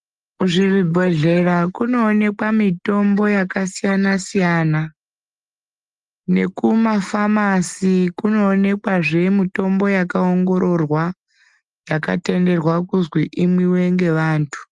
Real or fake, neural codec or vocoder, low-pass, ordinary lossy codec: real; none; 10.8 kHz; Opus, 32 kbps